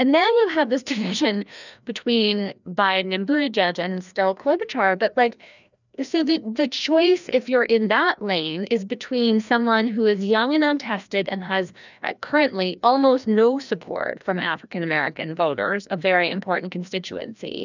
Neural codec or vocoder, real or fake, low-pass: codec, 16 kHz, 1 kbps, FreqCodec, larger model; fake; 7.2 kHz